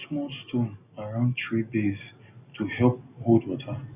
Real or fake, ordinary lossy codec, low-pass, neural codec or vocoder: real; none; 3.6 kHz; none